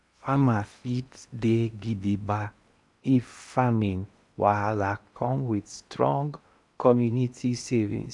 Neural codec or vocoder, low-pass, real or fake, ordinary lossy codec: codec, 16 kHz in and 24 kHz out, 0.8 kbps, FocalCodec, streaming, 65536 codes; 10.8 kHz; fake; none